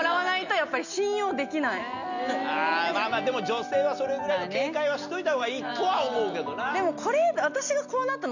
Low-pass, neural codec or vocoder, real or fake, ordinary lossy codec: 7.2 kHz; none; real; none